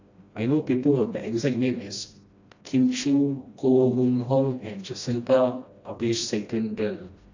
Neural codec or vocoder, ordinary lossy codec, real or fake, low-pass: codec, 16 kHz, 1 kbps, FreqCodec, smaller model; AAC, 48 kbps; fake; 7.2 kHz